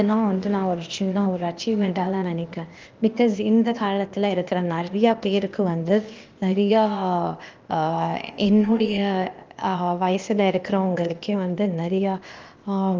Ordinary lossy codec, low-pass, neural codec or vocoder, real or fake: Opus, 24 kbps; 7.2 kHz; codec, 16 kHz, 0.8 kbps, ZipCodec; fake